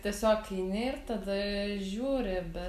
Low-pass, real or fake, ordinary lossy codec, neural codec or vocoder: 14.4 kHz; real; AAC, 96 kbps; none